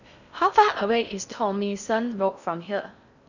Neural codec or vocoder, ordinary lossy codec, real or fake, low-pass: codec, 16 kHz in and 24 kHz out, 0.6 kbps, FocalCodec, streaming, 4096 codes; none; fake; 7.2 kHz